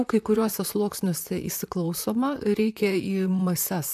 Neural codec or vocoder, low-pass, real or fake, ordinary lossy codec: vocoder, 44.1 kHz, 128 mel bands, Pupu-Vocoder; 14.4 kHz; fake; AAC, 96 kbps